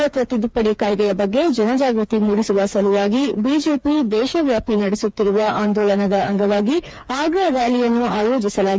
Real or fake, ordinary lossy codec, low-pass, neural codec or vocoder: fake; none; none; codec, 16 kHz, 4 kbps, FreqCodec, smaller model